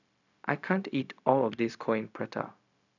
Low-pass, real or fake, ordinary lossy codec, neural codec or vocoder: 7.2 kHz; fake; none; codec, 16 kHz, 0.4 kbps, LongCat-Audio-Codec